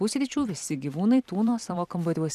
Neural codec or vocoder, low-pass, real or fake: vocoder, 44.1 kHz, 128 mel bands every 256 samples, BigVGAN v2; 14.4 kHz; fake